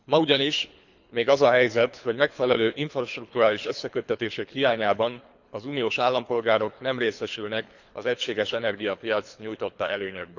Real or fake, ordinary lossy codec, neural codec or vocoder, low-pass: fake; none; codec, 24 kHz, 3 kbps, HILCodec; 7.2 kHz